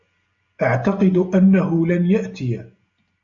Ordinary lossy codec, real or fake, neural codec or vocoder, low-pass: AAC, 64 kbps; real; none; 7.2 kHz